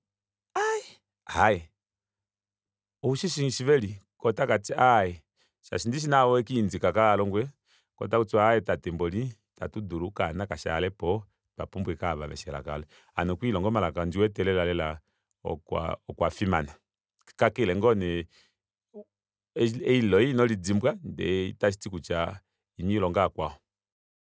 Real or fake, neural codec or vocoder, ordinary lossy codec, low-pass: real; none; none; none